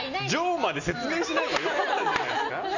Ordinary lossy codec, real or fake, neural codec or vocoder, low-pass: none; real; none; 7.2 kHz